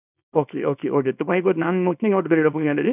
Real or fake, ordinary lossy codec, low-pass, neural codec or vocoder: fake; none; 3.6 kHz; codec, 24 kHz, 0.9 kbps, WavTokenizer, small release